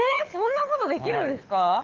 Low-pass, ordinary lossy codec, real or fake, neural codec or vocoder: 7.2 kHz; Opus, 32 kbps; fake; codec, 24 kHz, 6 kbps, HILCodec